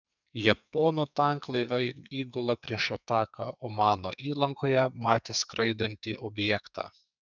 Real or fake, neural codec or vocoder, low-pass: fake; codec, 44.1 kHz, 2.6 kbps, SNAC; 7.2 kHz